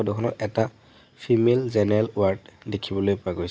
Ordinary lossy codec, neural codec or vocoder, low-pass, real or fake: none; none; none; real